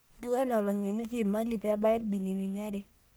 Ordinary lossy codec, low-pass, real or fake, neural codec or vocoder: none; none; fake; codec, 44.1 kHz, 1.7 kbps, Pupu-Codec